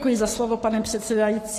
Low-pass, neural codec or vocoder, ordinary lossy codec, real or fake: 14.4 kHz; codec, 44.1 kHz, 7.8 kbps, Pupu-Codec; AAC, 48 kbps; fake